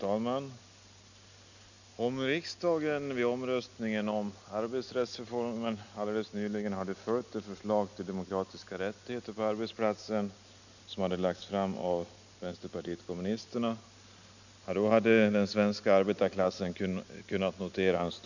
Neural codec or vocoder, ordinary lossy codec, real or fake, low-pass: none; none; real; 7.2 kHz